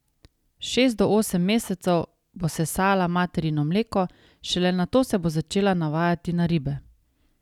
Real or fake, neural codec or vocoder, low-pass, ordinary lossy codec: real; none; 19.8 kHz; none